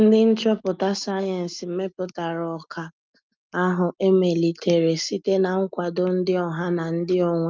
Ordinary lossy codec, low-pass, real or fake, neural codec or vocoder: Opus, 32 kbps; 7.2 kHz; real; none